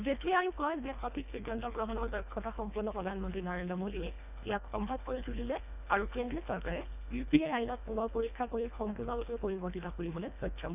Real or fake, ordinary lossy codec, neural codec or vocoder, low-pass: fake; none; codec, 24 kHz, 1.5 kbps, HILCodec; 3.6 kHz